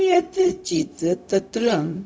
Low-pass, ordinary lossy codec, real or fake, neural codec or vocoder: none; none; fake; codec, 16 kHz, 0.4 kbps, LongCat-Audio-Codec